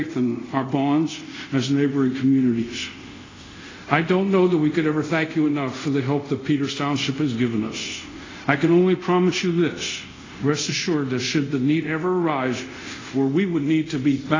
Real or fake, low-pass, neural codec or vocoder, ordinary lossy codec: fake; 7.2 kHz; codec, 24 kHz, 0.5 kbps, DualCodec; AAC, 32 kbps